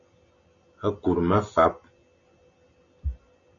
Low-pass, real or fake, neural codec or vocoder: 7.2 kHz; real; none